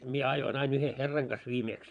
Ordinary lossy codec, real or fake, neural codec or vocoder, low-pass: none; fake; vocoder, 22.05 kHz, 80 mel bands, Vocos; 9.9 kHz